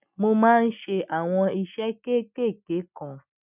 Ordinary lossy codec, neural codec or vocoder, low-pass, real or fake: none; none; 3.6 kHz; real